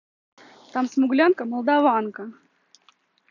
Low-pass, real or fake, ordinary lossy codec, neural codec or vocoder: 7.2 kHz; real; none; none